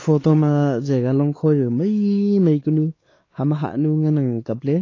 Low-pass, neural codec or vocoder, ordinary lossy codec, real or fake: 7.2 kHz; codec, 16 kHz, 4 kbps, X-Codec, WavLM features, trained on Multilingual LibriSpeech; AAC, 32 kbps; fake